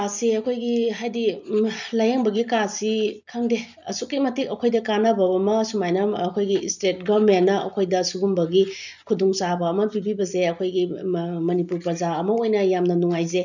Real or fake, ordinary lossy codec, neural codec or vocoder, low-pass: real; none; none; 7.2 kHz